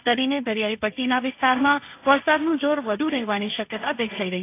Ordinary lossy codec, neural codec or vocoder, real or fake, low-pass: AAC, 24 kbps; codec, 16 kHz, 1.1 kbps, Voila-Tokenizer; fake; 3.6 kHz